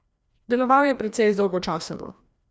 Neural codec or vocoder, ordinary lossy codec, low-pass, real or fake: codec, 16 kHz, 1 kbps, FreqCodec, larger model; none; none; fake